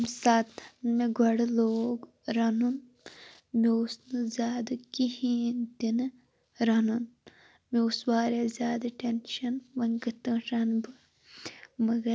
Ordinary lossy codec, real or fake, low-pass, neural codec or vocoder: none; real; none; none